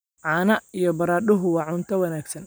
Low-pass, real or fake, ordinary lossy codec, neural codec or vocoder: none; real; none; none